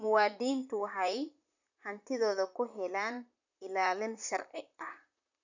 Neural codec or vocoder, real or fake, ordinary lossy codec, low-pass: vocoder, 44.1 kHz, 128 mel bands, Pupu-Vocoder; fake; none; 7.2 kHz